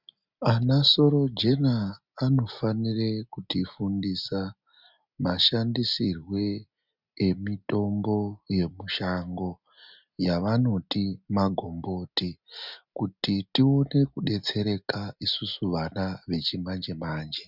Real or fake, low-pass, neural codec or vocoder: real; 5.4 kHz; none